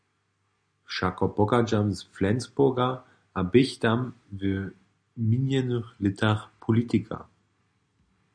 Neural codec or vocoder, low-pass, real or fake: none; 9.9 kHz; real